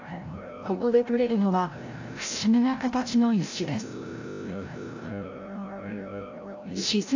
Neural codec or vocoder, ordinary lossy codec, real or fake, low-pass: codec, 16 kHz, 0.5 kbps, FreqCodec, larger model; AAC, 48 kbps; fake; 7.2 kHz